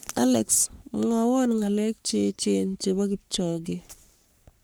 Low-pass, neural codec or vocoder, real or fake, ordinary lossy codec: none; codec, 44.1 kHz, 3.4 kbps, Pupu-Codec; fake; none